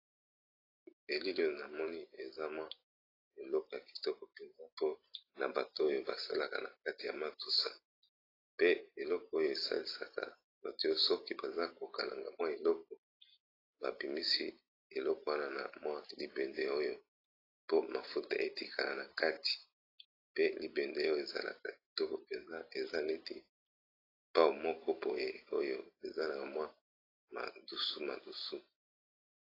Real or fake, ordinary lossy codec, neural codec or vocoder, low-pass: real; AAC, 24 kbps; none; 5.4 kHz